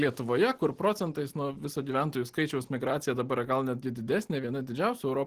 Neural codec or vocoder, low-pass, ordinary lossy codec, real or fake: none; 14.4 kHz; Opus, 16 kbps; real